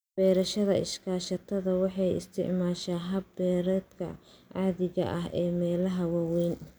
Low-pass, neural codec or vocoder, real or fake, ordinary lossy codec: none; none; real; none